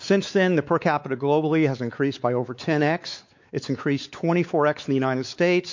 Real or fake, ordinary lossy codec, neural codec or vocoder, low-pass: fake; MP3, 48 kbps; codec, 16 kHz, 4 kbps, X-Codec, WavLM features, trained on Multilingual LibriSpeech; 7.2 kHz